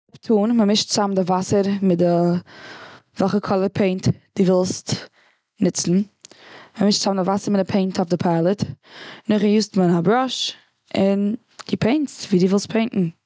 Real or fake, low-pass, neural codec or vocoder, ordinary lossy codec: real; none; none; none